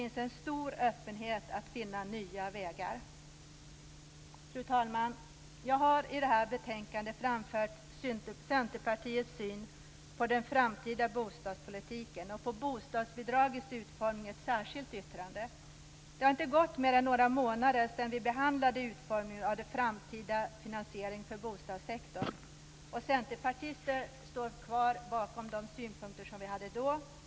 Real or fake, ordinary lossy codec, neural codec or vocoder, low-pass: real; none; none; none